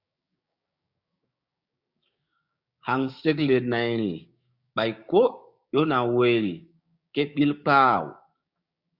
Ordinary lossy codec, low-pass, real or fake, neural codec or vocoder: Opus, 64 kbps; 5.4 kHz; fake; codec, 16 kHz, 6 kbps, DAC